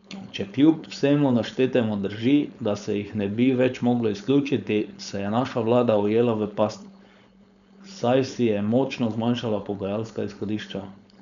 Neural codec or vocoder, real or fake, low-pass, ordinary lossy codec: codec, 16 kHz, 4.8 kbps, FACodec; fake; 7.2 kHz; none